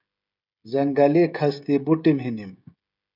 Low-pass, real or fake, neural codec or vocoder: 5.4 kHz; fake; codec, 16 kHz, 16 kbps, FreqCodec, smaller model